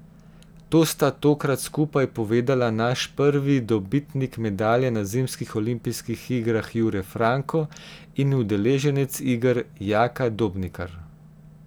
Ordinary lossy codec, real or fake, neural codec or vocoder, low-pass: none; real; none; none